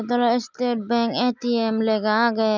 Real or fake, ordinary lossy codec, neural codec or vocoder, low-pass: real; none; none; 7.2 kHz